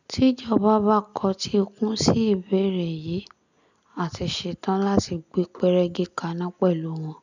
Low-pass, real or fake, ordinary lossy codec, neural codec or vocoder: 7.2 kHz; real; none; none